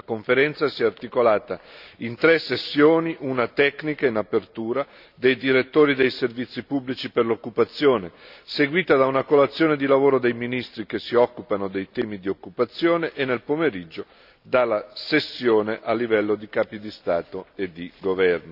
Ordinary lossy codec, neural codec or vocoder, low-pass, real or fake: none; none; 5.4 kHz; real